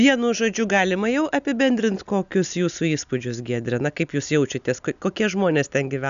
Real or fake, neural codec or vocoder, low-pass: real; none; 7.2 kHz